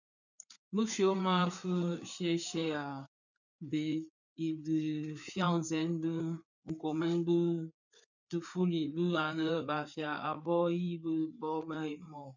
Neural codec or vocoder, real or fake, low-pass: codec, 16 kHz, 4 kbps, FreqCodec, larger model; fake; 7.2 kHz